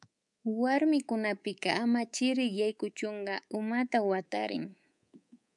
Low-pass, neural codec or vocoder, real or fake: 10.8 kHz; codec, 24 kHz, 3.1 kbps, DualCodec; fake